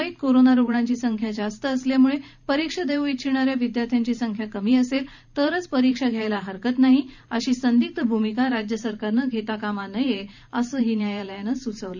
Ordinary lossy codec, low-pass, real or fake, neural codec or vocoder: none; 7.2 kHz; fake; vocoder, 44.1 kHz, 128 mel bands every 512 samples, BigVGAN v2